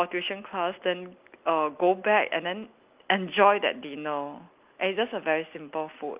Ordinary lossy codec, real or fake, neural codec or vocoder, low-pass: Opus, 24 kbps; real; none; 3.6 kHz